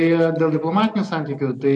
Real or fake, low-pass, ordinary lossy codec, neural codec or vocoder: real; 10.8 kHz; AAC, 64 kbps; none